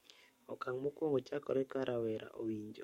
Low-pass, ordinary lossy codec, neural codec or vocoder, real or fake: 19.8 kHz; MP3, 64 kbps; codec, 44.1 kHz, 7.8 kbps, DAC; fake